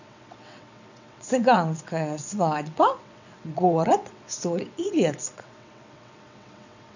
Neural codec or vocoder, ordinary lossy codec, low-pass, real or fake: vocoder, 22.05 kHz, 80 mel bands, WaveNeXt; none; 7.2 kHz; fake